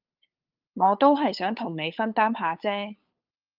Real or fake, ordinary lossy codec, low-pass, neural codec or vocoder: fake; Opus, 24 kbps; 5.4 kHz; codec, 16 kHz, 8 kbps, FunCodec, trained on LibriTTS, 25 frames a second